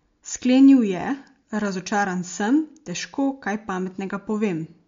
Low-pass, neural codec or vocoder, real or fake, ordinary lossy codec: 7.2 kHz; none; real; MP3, 48 kbps